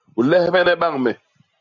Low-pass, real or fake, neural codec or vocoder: 7.2 kHz; real; none